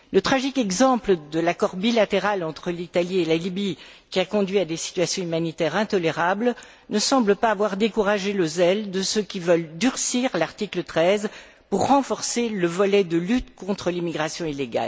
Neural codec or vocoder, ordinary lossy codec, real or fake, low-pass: none; none; real; none